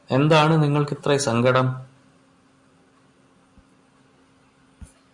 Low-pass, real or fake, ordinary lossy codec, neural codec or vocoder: 10.8 kHz; real; MP3, 96 kbps; none